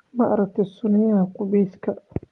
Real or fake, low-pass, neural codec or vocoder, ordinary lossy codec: real; 10.8 kHz; none; Opus, 24 kbps